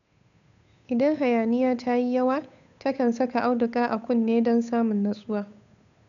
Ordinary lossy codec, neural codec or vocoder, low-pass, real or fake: none; codec, 16 kHz, 8 kbps, FunCodec, trained on Chinese and English, 25 frames a second; 7.2 kHz; fake